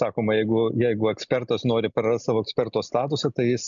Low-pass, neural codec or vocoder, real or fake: 7.2 kHz; none; real